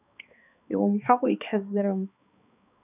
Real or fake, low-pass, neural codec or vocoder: fake; 3.6 kHz; codec, 16 kHz, 2 kbps, X-Codec, HuBERT features, trained on balanced general audio